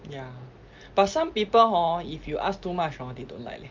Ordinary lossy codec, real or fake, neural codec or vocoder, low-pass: Opus, 24 kbps; real; none; 7.2 kHz